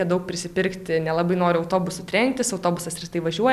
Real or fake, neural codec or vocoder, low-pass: real; none; 14.4 kHz